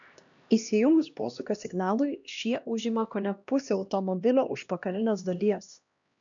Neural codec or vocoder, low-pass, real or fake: codec, 16 kHz, 1 kbps, X-Codec, HuBERT features, trained on LibriSpeech; 7.2 kHz; fake